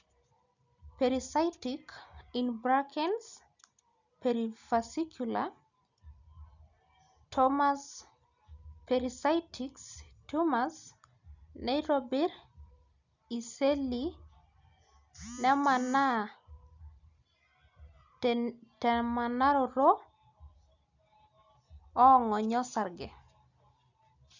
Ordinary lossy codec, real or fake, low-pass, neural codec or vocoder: none; real; 7.2 kHz; none